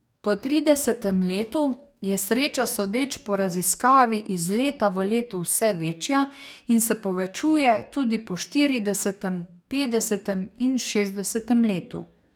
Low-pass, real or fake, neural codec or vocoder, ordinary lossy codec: 19.8 kHz; fake; codec, 44.1 kHz, 2.6 kbps, DAC; none